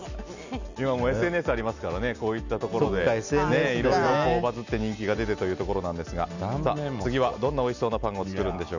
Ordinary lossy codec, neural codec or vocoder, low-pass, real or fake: none; none; 7.2 kHz; real